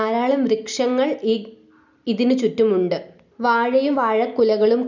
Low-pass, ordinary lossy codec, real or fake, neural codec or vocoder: 7.2 kHz; none; real; none